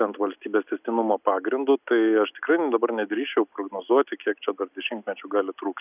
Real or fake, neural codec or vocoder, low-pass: real; none; 3.6 kHz